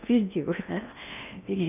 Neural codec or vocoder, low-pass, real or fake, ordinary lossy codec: codec, 16 kHz in and 24 kHz out, 0.6 kbps, FocalCodec, streaming, 2048 codes; 3.6 kHz; fake; none